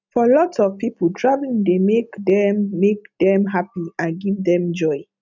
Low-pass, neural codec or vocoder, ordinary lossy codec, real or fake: 7.2 kHz; none; none; real